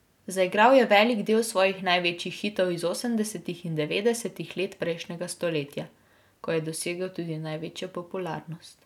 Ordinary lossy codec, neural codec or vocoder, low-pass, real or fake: none; none; 19.8 kHz; real